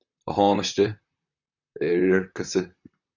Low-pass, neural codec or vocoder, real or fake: 7.2 kHz; vocoder, 44.1 kHz, 128 mel bands, Pupu-Vocoder; fake